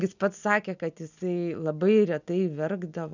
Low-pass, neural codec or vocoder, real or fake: 7.2 kHz; none; real